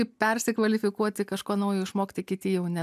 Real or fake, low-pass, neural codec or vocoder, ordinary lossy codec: real; 14.4 kHz; none; MP3, 96 kbps